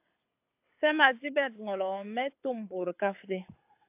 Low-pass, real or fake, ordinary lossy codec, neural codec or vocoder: 3.6 kHz; fake; AAC, 32 kbps; codec, 24 kHz, 6 kbps, HILCodec